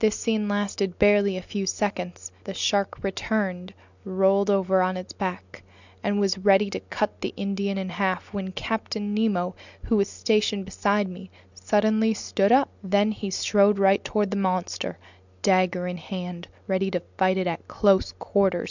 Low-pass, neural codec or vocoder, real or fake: 7.2 kHz; none; real